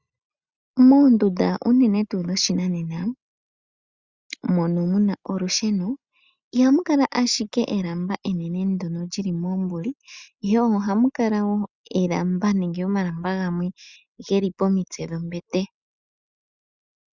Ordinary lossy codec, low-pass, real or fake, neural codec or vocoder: Opus, 64 kbps; 7.2 kHz; real; none